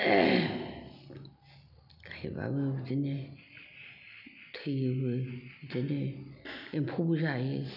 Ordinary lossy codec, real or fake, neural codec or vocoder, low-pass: none; real; none; 5.4 kHz